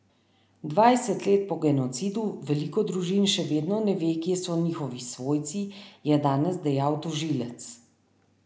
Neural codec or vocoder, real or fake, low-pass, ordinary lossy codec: none; real; none; none